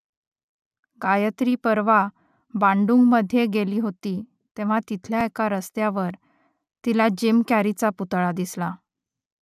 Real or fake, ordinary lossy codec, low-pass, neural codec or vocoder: real; none; 14.4 kHz; none